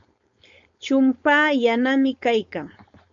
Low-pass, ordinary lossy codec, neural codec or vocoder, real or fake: 7.2 kHz; MP3, 48 kbps; codec, 16 kHz, 4.8 kbps, FACodec; fake